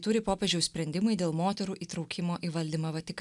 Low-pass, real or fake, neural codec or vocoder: 10.8 kHz; real; none